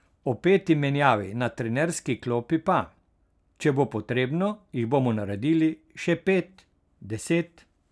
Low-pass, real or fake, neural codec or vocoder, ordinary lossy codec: none; real; none; none